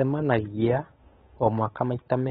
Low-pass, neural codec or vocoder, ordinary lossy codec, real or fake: 7.2 kHz; codec, 16 kHz, 8 kbps, FunCodec, trained on Chinese and English, 25 frames a second; AAC, 16 kbps; fake